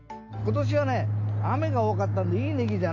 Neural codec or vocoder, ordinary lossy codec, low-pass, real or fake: none; none; 7.2 kHz; real